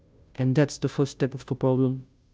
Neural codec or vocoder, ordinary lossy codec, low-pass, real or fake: codec, 16 kHz, 0.5 kbps, FunCodec, trained on Chinese and English, 25 frames a second; none; none; fake